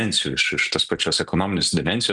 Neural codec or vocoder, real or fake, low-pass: none; real; 10.8 kHz